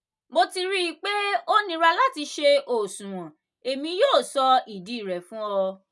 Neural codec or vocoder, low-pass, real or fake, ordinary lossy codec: none; none; real; none